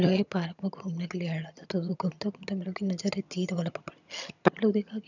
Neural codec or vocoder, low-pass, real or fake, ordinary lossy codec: vocoder, 22.05 kHz, 80 mel bands, HiFi-GAN; 7.2 kHz; fake; none